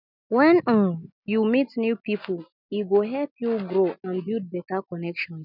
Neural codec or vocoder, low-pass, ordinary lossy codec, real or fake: none; 5.4 kHz; none; real